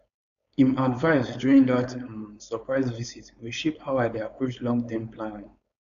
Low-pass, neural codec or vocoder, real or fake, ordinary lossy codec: 7.2 kHz; codec, 16 kHz, 4.8 kbps, FACodec; fake; none